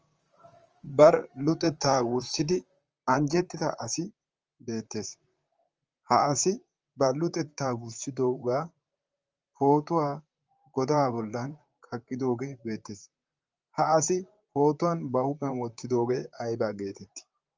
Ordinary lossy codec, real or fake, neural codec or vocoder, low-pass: Opus, 24 kbps; fake; vocoder, 44.1 kHz, 128 mel bands, Pupu-Vocoder; 7.2 kHz